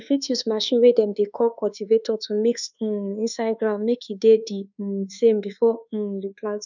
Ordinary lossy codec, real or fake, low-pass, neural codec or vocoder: none; fake; 7.2 kHz; codec, 24 kHz, 1.2 kbps, DualCodec